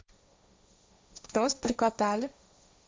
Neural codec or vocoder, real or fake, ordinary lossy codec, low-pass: codec, 16 kHz, 1.1 kbps, Voila-Tokenizer; fake; none; none